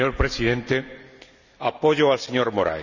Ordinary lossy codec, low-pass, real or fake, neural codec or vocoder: none; 7.2 kHz; real; none